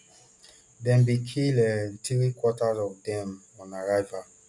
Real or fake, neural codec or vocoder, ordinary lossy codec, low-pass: real; none; none; 10.8 kHz